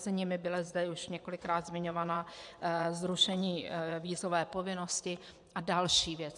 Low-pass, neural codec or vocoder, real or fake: 10.8 kHz; vocoder, 24 kHz, 100 mel bands, Vocos; fake